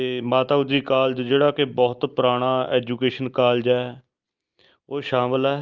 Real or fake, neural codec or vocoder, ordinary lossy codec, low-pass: real; none; Opus, 24 kbps; 7.2 kHz